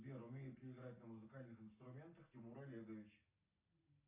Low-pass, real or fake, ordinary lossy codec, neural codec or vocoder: 3.6 kHz; real; AAC, 24 kbps; none